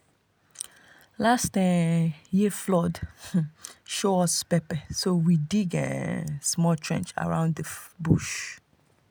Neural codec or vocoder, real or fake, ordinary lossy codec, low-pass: none; real; none; none